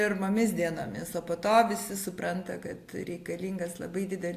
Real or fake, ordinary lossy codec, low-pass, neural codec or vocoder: real; AAC, 64 kbps; 14.4 kHz; none